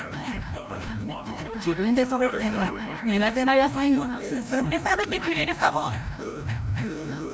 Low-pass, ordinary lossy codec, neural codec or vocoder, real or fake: none; none; codec, 16 kHz, 0.5 kbps, FreqCodec, larger model; fake